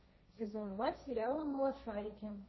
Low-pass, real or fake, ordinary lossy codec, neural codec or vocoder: 7.2 kHz; fake; MP3, 24 kbps; codec, 16 kHz, 1.1 kbps, Voila-Tokenizer